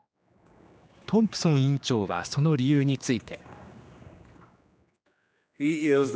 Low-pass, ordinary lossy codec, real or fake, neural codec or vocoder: none; none; fake; codec, 16 kHz, 2 kbps, X-Codec, HuBERT features, trained on general audio